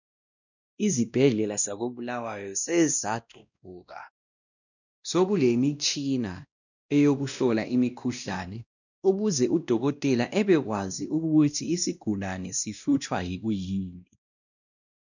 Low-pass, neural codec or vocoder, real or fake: 7.2 kHz; codec, 16 kHz, 1 kbps, X-Codec, WavLM features, trained on Multilingual LibriSpeech; fake